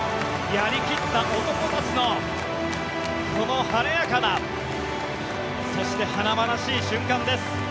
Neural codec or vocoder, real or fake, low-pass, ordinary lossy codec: none; real; none; none